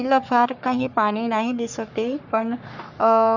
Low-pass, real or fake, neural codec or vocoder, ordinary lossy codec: 7.2 kHz; fake; codec, 44.1 kHz, 3.4 kbps, Pupu-Codec; none